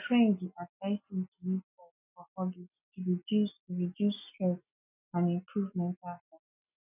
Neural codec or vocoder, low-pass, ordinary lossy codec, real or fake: none; 3.6 kHz; none; real